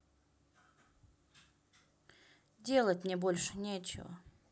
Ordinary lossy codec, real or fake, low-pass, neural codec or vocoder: none; real; none; none